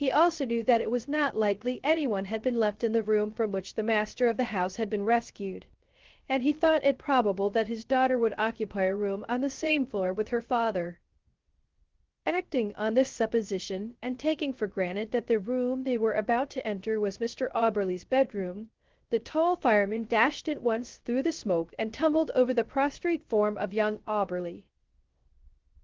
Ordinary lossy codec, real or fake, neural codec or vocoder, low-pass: Opus, 16 kbps; fake; codec, 16 kHz, 0.3 kbps, FocalCodec; 7.2 kHz